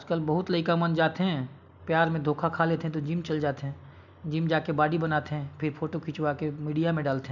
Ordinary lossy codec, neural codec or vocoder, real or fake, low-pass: none; none; real; 7.2 kHz